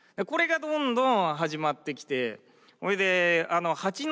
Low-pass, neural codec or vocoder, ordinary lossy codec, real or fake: none; none; none; real